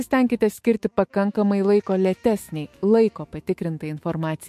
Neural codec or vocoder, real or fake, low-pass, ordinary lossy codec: autoencoder, 48 kHz, 128 numbers a frame, DAC-VAE, trained on Japanese speech; fake; 14.4 kHz; MP3, 64 kbps